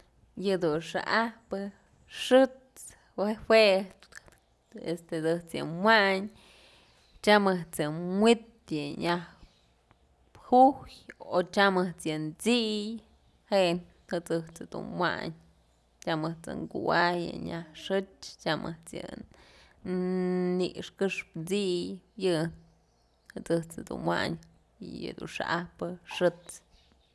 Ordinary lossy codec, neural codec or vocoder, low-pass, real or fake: none; none; none; real